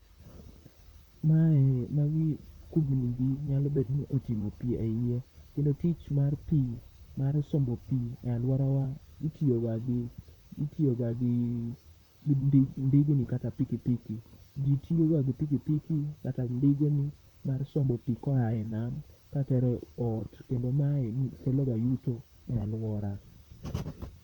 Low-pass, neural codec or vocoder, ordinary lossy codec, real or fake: 19.8 kHz; vocoder, 44.1 kHz, 128 mel bands, Pupu-Vocoder; none; fake